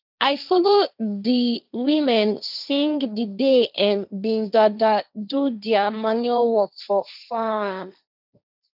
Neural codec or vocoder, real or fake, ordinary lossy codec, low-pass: codec, 16 kHz, 1.1 kbps, Voila-Tokenizer; fake; none; 5.4 kHz